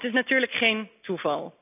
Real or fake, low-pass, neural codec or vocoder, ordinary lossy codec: real; 3.6 kHz; none; none